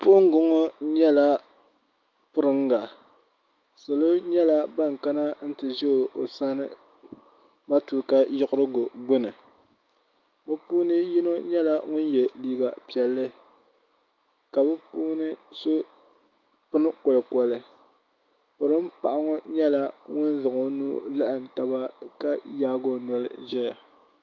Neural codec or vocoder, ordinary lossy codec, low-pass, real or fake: autoencoder, 48 kHz, 128 numbers a frame, DAC-VAE, trained on Japanese speech; Opus, 24 kbps; 7.2 kHz; fake